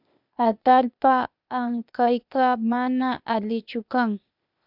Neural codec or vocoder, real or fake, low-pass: codec, 16 kHz, 0.8 kbps, ZipCodec; fake; 5.4 kHz